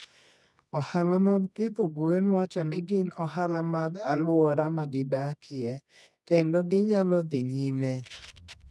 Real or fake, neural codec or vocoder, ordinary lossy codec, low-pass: fake; codec, 24 kHz, 0.9 kbps, WavTokenizer, medium music audio release; none; none